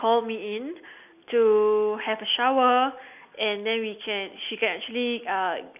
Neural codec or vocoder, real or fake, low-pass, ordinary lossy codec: none; real; 3.6 kHz; none